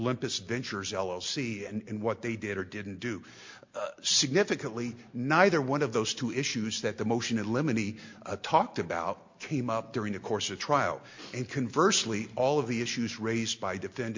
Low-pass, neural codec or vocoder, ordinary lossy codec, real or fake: 7.2 kHz; none; MP3, 48 kbps; real